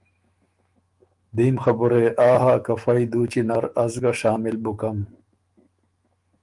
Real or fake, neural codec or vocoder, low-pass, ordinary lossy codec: fake; autoencoder, 48 kHz, 128 numbers a frame, DAC-VAE, trained on Japanese speech; 10.8 kHz; Opus, 32 kbps